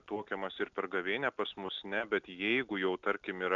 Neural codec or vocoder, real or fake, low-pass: none; real; 7.2 kHz